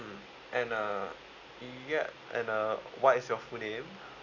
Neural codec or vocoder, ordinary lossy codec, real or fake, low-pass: none; none; real; 7.2 kHz